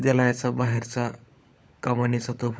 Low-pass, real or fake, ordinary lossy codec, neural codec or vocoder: none; fake; none; codec, 16 kHz, 16 kbps, FunCodec, trained on LibriTTS, 50 frames a second